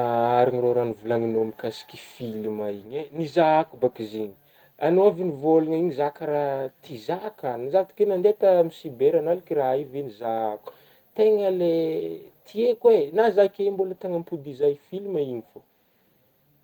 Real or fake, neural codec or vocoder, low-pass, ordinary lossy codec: real; none; 19.8 kHz; Opus, 24 kbps